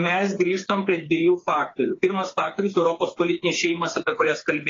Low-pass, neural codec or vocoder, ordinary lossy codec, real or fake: 7.2 kHz; codec, 16 kHz, 4 kbps, FreqCodec, smaller model; AAC, 32 kbps; fake